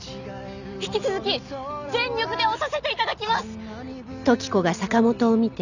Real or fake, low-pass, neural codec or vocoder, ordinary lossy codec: real; 7.2 kHz; none; none